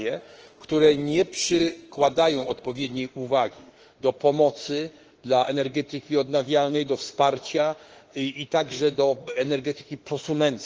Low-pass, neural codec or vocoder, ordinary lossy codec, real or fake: 7.2 kHz; autoencoder, 48 kHz, 32 numbers a frame, DAC-VAE, trained on Japanese speech; Opus, 16 kbps; fake